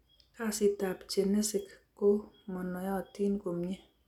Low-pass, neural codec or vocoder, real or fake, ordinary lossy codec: 19.8 kHz; none; real; none